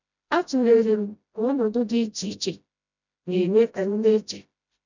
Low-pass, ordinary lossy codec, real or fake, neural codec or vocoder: 7.2 kHz; none; fake; codec, 16 kHz, 0.5 kbps, FreqCodec, smaller model